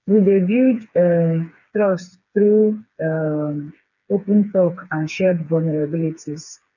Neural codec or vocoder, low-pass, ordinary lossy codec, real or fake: codec, 16 kHz, 4 kbps, FreqCodec, smaller model; 7.2 kHz; none; fake